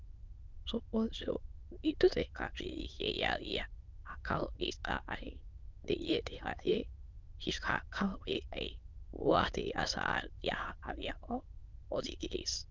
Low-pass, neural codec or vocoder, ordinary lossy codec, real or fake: 7.2 kHz; autoencoder, 22.05 kHz, a latent of 192 numbers a frame, VITS, trained on many speakers; Opus, 24 kbps; fake